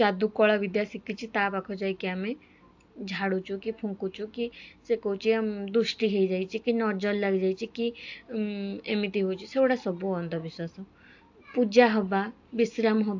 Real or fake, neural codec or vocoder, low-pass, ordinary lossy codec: real; none; 7.2 kHz; AAC, 48 kbps